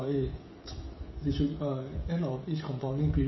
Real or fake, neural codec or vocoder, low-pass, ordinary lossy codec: fake; codec, 16 kHz, 16 kbps, FreqCodec, smaller model; 7.2 kHz; MP3, 24 kbps